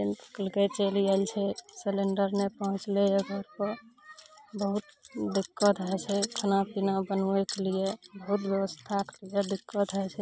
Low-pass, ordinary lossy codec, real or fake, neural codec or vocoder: none; none; real; none